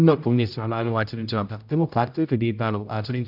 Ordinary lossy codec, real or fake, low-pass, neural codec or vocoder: none; fake; 5.4 kHz; codec, 16 kHz, 0.5 kbps, X-Codec, HuBERT features, trained on general audio